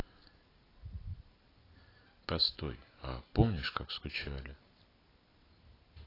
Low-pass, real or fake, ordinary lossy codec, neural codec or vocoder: 5.4 kHz; real; AAC, 24 kbps; none